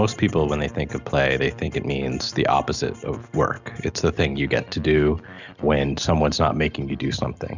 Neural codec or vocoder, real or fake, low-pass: none; real; 7.2 kHz